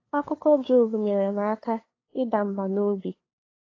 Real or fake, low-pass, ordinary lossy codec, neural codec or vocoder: fake; 7.2 kHz; AAC, 32 kbps; codec, 16 kHz, 2 kbps, FunCodec, trained on LibriTTS, 25 frames a second